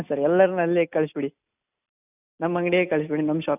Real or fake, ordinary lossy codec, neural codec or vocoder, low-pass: real; none; none; 3.6 kHz